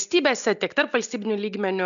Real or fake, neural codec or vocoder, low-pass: real; none; 7.2 kHz